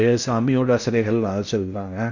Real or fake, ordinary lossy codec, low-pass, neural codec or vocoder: fake; none; 7.2 kHz; codec, 16 kHz in and 24 kHz out, 0.6 kbps, FocalCodec, streaming, 4096 codes